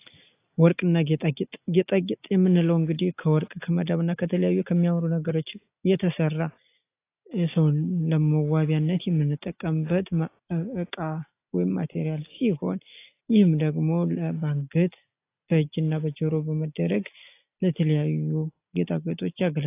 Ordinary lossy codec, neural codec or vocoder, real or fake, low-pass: AAC, 24 kbps; none; real; 3.6 kHz